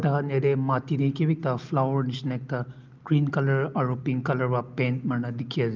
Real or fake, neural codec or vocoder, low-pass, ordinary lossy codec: real; none; 7.2 kHz; Opus, 24 kbps